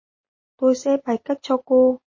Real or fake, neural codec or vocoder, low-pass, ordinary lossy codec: real; none; 7.2 kHz; MP3, 32 kbps